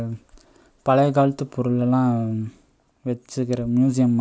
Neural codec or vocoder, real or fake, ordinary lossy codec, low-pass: none; real; none; none